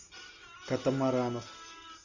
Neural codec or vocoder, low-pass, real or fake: none; 7.2 kHz; real